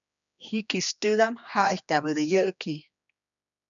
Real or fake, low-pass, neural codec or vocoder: fake; 7.2 kHz; codec, 16 kHz, 2 kbps, X-Codec, HuBERT features, trained on general audio